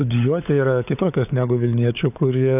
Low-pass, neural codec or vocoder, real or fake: 3.6 kHz; codec, 16 kHz, 16 kbps, FunCodec, trained on LibriTTS, 50 frames a second; fake